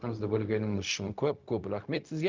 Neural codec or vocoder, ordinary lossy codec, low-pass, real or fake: codec, 16 kHz, 0.4 kbps, LongCat-Audio-Codec; Opus, 24 kbps; 7.2 kHz; fake